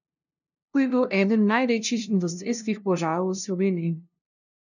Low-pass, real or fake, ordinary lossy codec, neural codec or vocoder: 7.2 kHz; fake; none; codec, 16 kHz, 0.5 kbps, FunCodec, trained on LibriTTS, 25 frames a second